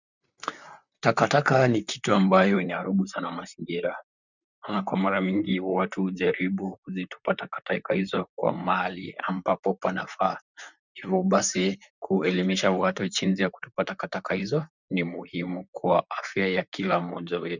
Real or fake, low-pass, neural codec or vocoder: fake; 7.2 kHz; vocoder, 44.1 kHz, 128 mel bands, Pupu-Vocoder